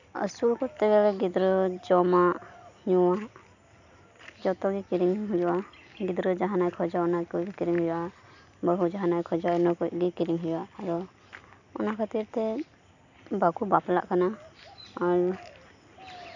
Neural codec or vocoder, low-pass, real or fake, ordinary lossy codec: none; 7.2 kHz; real; none